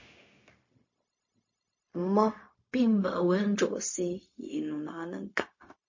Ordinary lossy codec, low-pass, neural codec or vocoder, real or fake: MP3, 32 kbps; 7.2 kHz; codec, 16 kHz, 0.4 kbps, LongCat-Audio-Codec; fake